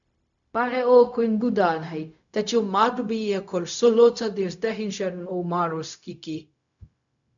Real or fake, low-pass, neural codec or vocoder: fake; 7.2 kHz; codec, 16 kHz, 0.4 kbps, LongCat-Audio-Codec